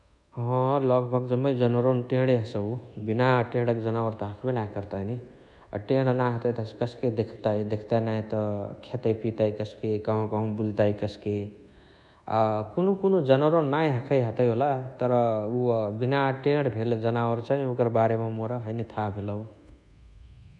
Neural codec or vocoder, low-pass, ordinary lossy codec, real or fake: codec, 24 kHz, 1.2 kbps, DualCodec; 10.8 kHz; none; fake